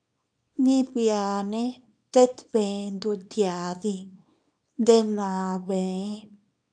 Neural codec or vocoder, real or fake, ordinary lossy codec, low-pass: codec, 24 kHz, 0.9 kbps, WavTokenizer, small release; fake; MP3, 96 kbps; 9.9 kHz